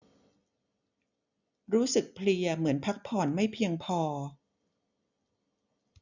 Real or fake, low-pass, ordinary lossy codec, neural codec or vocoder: real; 7.2 kHz; none; none